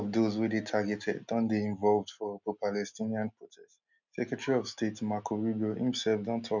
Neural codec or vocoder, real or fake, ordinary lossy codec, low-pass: none; real; none; 7.2 kHz